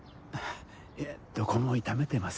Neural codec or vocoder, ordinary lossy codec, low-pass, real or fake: none; none; none; real